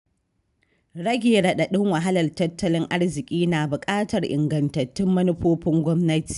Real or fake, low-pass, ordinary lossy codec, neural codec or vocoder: real; 10.8 kHz; none; none